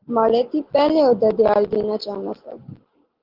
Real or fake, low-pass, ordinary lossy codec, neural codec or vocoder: real; 5.4 kHz; Opus, 16 kbps; none